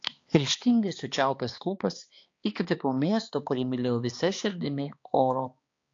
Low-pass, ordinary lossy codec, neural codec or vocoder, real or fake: 7.2 kHz; AAC, 48 kbps; codec, 16 kHz, 2 kbps, X-Codec, HuBERT features, trained on balanced general audio; fake